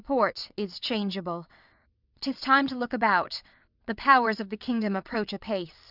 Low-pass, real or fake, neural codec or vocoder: 5.4 kHz; fake; vocoder, 22.05 kHz, 80 mel bands, WaveNeXt